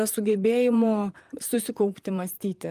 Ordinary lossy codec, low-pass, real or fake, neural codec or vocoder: Opus, 32 kbps; 14.4 kHz; fake; vocoder, 44.1 kHz, 128 mel bands, Pupu-Vocoder